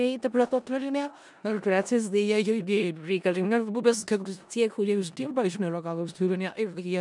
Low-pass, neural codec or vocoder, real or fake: 10.8 kHz; codec, 16 kHz in and 24 kHz out, 0.4 kbps, LongCat-Audio-Codec, four codebook decoder; fake